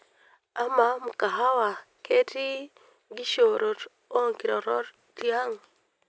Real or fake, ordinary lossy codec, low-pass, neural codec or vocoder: real; none; none; none